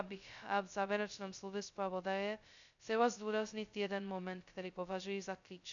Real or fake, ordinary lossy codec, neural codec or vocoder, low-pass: fake; MP3, 96 kbps; codec, 16 kHz, 0.2 kbps, FocalCodec; 7.2 kHz